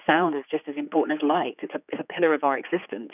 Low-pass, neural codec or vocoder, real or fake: 3.6 kHz; codec, 16 kHz, 4 kbps, X-Codec, HuBERT features, trained on general audio; fake